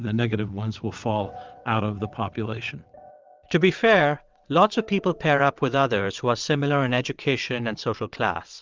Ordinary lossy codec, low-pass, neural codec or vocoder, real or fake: Opus, 24 kbps; 7.2 kHz; vocoder, 22.05 kHz, 80 mel bands, WaveNeXt; fake